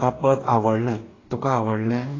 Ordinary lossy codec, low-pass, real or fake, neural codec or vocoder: AAC, 32 kbps; 7.2 kHz; fake; codec, 44.1 kHz, 2.6 kbps, DAC